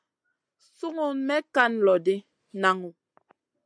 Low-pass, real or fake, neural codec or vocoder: 9.9 kHz; real; none